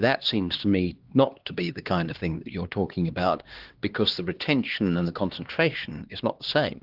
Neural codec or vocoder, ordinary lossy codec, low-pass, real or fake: codec, 16 kHz, 2 kbps, X-Codec, HuBERT features, trained on LibriSpeech; Opus, 16 kbps; 5.4 kHz; fake